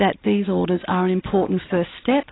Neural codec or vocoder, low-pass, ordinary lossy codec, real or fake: none; 7.2 kHz; AAC, 16 kbps; real